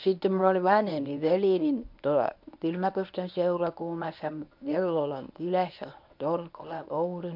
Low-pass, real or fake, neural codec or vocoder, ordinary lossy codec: 5.4 kHz; fake; codec, 24 kHz, 0.9 kbps, WavTokenizer, medium speech release version 1; MP3, 48 kbps